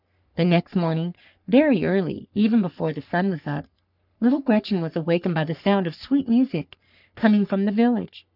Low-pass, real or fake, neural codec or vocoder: 5.4 kHz; fake; codec, 44.1 kHz, 3.4 kbps, Pupu-Codec